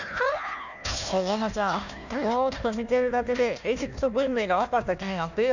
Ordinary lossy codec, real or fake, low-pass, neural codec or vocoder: none; fake; 7.2 kHz; codec, 16 kHz, 1 kbps, FunCodec, trained on Chinese and English, 50 frames a second